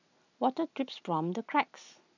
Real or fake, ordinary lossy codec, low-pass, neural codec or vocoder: real; none; 7.2 kHz; none